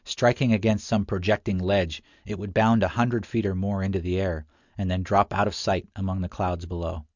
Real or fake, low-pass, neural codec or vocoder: real; 7.2 kHz; none